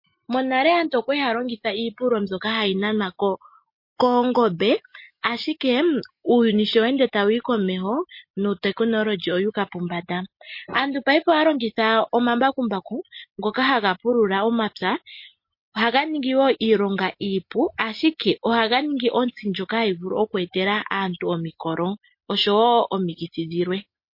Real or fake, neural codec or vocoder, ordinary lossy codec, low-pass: real; none; MP3, 32 kbps; 5.4 kHz